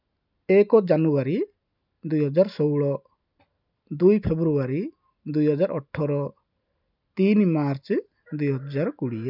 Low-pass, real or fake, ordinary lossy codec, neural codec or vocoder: 5.4 kHz; real; none; none